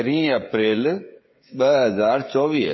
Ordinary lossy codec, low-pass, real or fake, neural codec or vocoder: MP3, 24 kbps; 7.2 kHz; fake; codec, 16 kHz, 16 kbps, FreqCodec, smaller model